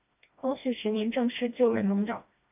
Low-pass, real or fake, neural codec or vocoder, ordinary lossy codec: 3.6 kHz; fake; codec, 16 kHz, 1 kbps, FreqCodec, smaller model; AAC, 32 kbps